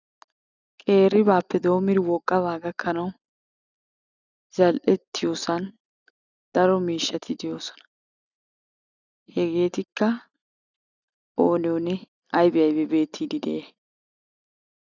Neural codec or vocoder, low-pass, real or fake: none; 7.2 kHz; real